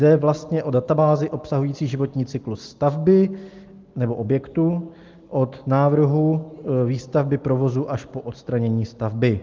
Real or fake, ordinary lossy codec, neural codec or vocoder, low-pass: real; Opus, 32 kbps; none; 7.2 kHz